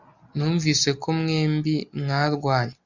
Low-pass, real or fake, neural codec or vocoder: 7.2 kHz; real; none